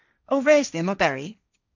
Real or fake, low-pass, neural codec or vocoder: fake; 7.2 kHz; codec, 16 kHz, 1.1 kbps, Voila-Tokenizer